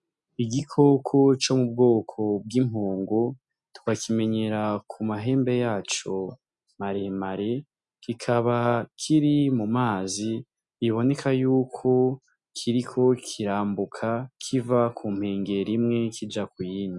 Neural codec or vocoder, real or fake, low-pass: none; real; 10.8 kHz